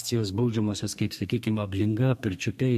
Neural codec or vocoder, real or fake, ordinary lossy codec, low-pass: codec, 32 kHz, 1.9 kbps, SNAC; fake; MP3, 64 kbps; 14.4 kHz